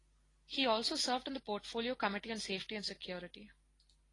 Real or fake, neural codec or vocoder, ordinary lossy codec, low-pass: real; none; AAC, 32 kbps; 10.8 kHz